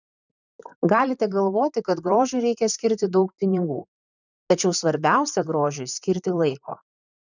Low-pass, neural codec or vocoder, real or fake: 7.2 kHz; vocoder, 44.1 kHz, 128 mel bands, Pupu-Vocoder; fake